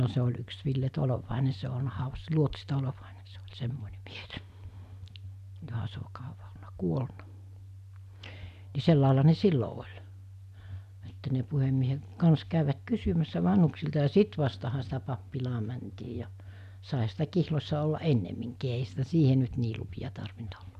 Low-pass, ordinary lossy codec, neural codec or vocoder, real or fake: 14.4 kHz; none; none; real